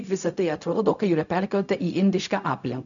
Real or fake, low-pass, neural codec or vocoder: fake; 7.2 kHz; codec, 16 kHz, 0.4 kbps, LongCat-Audio-Codec